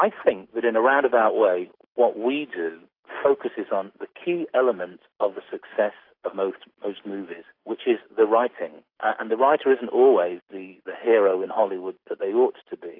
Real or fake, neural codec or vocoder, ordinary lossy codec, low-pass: real; none; AAC, 32 kbps; 5.4 kHz